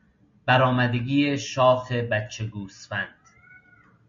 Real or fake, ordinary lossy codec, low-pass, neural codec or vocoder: real; MP3, 48 kbps; 7.2 kHz; none